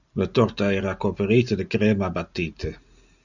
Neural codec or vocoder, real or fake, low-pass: none; real; 7.2 kHz